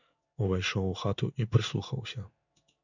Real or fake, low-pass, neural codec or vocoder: fake; 7.2 kHz; codec, 16 kHz in and 24 kHz out, 1 kbps, XY-Tokenizer